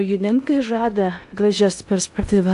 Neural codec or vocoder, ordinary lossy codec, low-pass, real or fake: codec, 16 kHz in and 24 kHz out, 0.6 kbps, FocalCodec, streaming, 2048 codes; AAC, 64 kbps; 10.8 kHz; fake